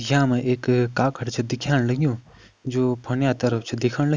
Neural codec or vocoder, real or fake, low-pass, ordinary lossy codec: none; real; none; none